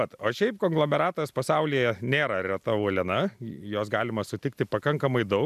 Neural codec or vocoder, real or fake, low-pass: none; real; 14.4 kHz